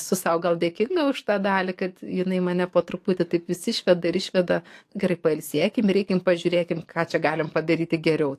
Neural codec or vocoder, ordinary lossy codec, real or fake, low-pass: codec, 44.1 kHz, 7.8 kbps, DAC; AAC, 64 kbps; fake; 14.4 kHz